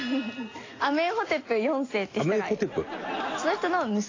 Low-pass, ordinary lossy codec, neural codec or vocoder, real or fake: 7.2 kHz; AAC, 32 kbps; none; real